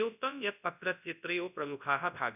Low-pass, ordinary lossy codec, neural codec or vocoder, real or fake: 3.6 kHz; none; codec, 24 kHz, 0.9 kbps, WavTokenizer, large speech release; fake